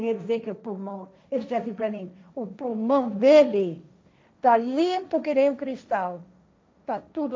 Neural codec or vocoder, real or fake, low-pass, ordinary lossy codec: codec, 16 kHz, 1.1 kbps, Voila-Tokenizer; fake; none; none